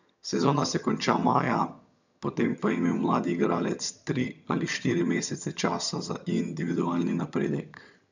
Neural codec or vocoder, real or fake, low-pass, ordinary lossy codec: vocoder, 22.05 kHz, 80 mel bands, HiFi-GAN; fake; 7.2 kHz; none